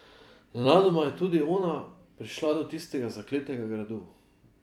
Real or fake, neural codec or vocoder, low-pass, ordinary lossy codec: fake; vocoder, 44.1 kHz, 128 mel bands every 256 samples, BigVGAN v2; 19.8 kHz; none